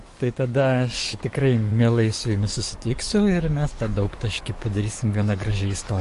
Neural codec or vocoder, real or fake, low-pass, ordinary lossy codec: codec, 44.1 kHz, 7.8 kbps, DAC; fake; 14.4 kHz; MP3, 48 kbps